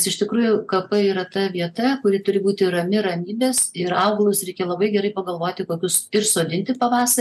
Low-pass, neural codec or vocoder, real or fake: 14.4 kHz; none; real